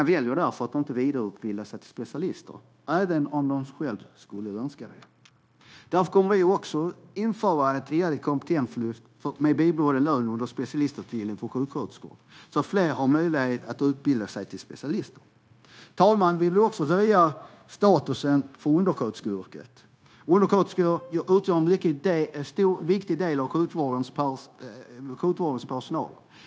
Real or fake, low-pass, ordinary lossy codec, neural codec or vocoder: fake; none; none; codec, 16 kHz, 0.9 kbps, LongCat-Audio-Codec